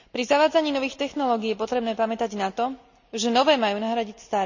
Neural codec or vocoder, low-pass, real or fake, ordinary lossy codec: none; 7.2 kHz; real; none